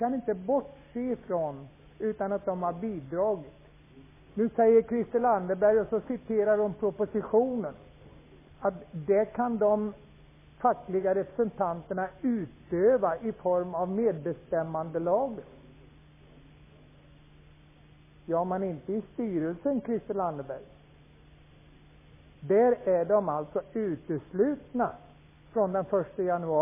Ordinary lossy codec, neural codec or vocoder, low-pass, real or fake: MP3, 16 kbps; none; 3.6 kHz; real